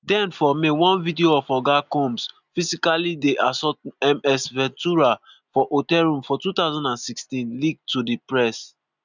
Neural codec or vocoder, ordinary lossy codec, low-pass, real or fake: none; none; 7.2 kHz; real